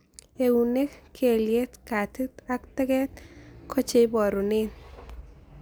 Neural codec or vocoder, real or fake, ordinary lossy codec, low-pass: none; real; none; none